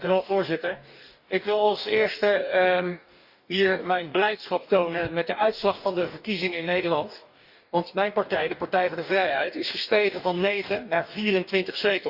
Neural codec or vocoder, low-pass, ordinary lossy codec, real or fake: codec, 44.1 kHz, 2.6 kbps, DAC; 5.4 kHz; Opus, 64 kbps; fake